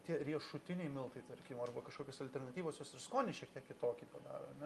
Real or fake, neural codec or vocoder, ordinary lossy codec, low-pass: real; none; Opus, 32 kbps; 14.4 kHz